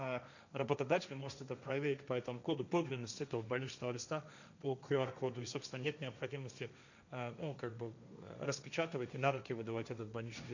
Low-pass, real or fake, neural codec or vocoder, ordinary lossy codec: 7.2 kHz; fake; codec, 16 kHz, 1.1 kbps, Voila-Tokenizer; none